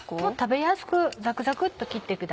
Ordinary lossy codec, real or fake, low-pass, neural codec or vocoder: none; real; none; none